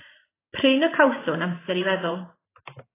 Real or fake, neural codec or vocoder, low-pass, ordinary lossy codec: fake; vocoder, 44.1 kHz, 128 mel bands every 512 samples, BigVGAN v2; 3.6 kHz; AAC, 16 kbps